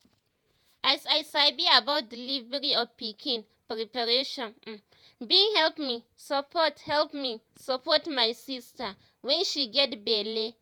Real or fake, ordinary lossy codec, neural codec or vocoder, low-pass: fake; none; vocoder, 48 kHz, 128 mel bands, Vocos; none